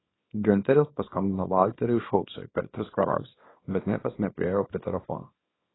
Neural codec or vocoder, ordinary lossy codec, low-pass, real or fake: codec, 24 kHz, 0.9 kbps, WavTokenizer, small release; AAC, 16 kbps; 7.2 kHz; fake